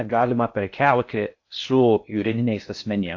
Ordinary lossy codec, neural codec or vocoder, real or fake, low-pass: AAC, 48 kbps; codec, 16 kHz in and 24 kHz out, 0.6 kbps, FocalCodec, streaming, 4096 codes; fake; 7.2 kHz